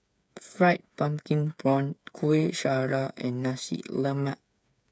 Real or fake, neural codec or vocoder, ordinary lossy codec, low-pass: fake; codec, 16 kHz, 8 kbps, FreqCodec, smaller model; none; none